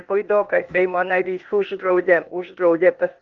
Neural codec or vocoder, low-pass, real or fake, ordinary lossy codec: codec, 16 kHz, 0.8 kbps, ZipCodec; 7.2 kHz; fake; Opus, 32 kbps